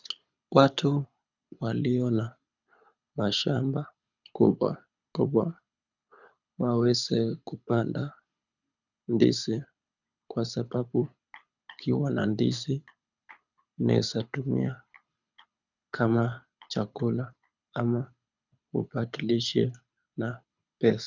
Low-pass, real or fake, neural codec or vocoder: 7.2 kHz; fake; codec, 24 kHz, 6 kbps, HILCodec